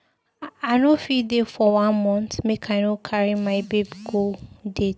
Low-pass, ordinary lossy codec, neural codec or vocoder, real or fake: none; none; none; real